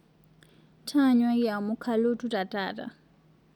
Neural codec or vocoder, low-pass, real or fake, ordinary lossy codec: none; 19.8 kHz; real; none